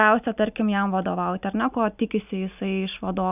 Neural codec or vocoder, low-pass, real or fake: none; 3.6 kHz; real